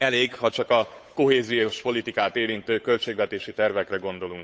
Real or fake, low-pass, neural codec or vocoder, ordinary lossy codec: fake; none; codec, 16 kHz, 8 kbps, FunCodec, trained on Chinese and English, 25 frames a second; none